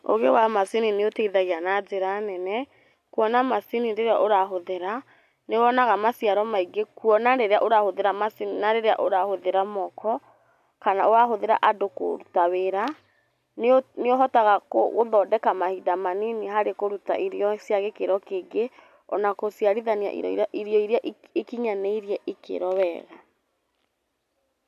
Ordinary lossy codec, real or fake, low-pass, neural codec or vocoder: none; real; 14.4 kHz; none